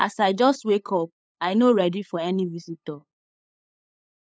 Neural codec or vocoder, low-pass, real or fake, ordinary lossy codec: codec, 16 kHz, 8 kbps, FunCodec, trained on LibriTTS, 25 frames a second; none; fake; none